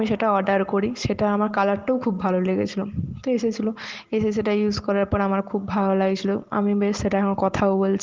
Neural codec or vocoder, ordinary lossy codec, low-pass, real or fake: none; Opus, 16 kbps; 7.2 kHz; real